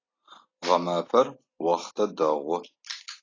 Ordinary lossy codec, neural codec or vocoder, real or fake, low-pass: AAC, 32 kbps; none; real; 7.2 kHz